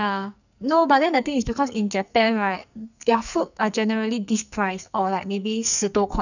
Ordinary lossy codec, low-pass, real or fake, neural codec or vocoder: none; 7.2 kHz; fake; codec, 44.1 kHz, 2.6 kbps, SNAC